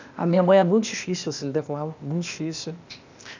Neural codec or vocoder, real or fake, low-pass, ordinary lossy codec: codec, 16 kHz, 0.8 kbps, ZipCodec; fake; 7.2 kHz; none